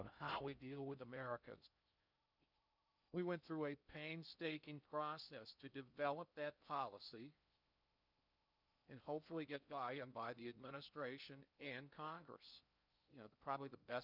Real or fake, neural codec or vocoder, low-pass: fake; codec, 16 kHz in and 24 kHz out, 0.6 kbps, FocalCodec, streaming, 2048 codes; 5.4 kHz